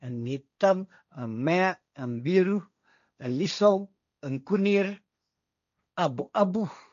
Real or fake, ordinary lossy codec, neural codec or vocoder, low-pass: fake; none; codec, 16 kHz, 1.1 kbps, Voila-Tokenizer; 7.2 kHz